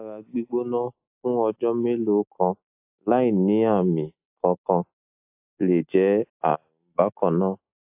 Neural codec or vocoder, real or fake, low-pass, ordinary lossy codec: none; real; 3.6 kHz; AAC, 32 kbps